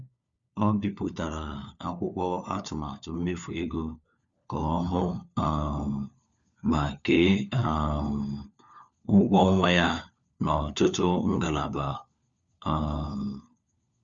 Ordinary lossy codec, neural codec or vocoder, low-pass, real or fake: Opus, 64 kbps; codec, 16 kHz, 4 kbps, FunCodec, trained on LibriTTS, 50 frames a second; 7.2 kHz; fake